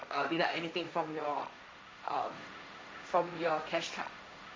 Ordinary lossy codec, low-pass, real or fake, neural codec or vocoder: none; none; fake; codec, 16 kHz, 1.1 kbps, Voila-Tokenizer